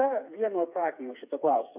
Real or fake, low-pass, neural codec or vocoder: fake; 3.6 kHz; codec, 16 kHz, 4 kbps, FreqCodec, smaller model